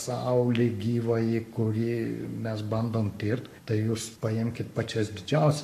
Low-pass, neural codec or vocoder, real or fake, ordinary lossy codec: 14.4 kHz; codec, 44.1 kHz, 7.8 kbps, Pupu-Codec; fake; MP3, 96 kbps